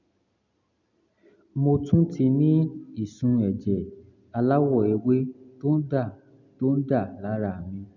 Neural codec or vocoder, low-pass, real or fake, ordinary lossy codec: none; 7.2 kHz; real; none